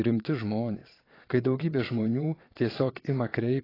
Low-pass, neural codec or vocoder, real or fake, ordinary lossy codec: 5.4 kHz; vocoder, 22.05 kHz, 80 mel bands, Vocos; fake; AAC, 24 kbps